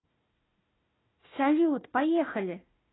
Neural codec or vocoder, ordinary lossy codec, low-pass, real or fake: codec, 16 kHz, 1 kbps, FunCodec, trained on Chinese and English, 50 frames a second; AAC, 16 kbps; 7.2 kHz; fake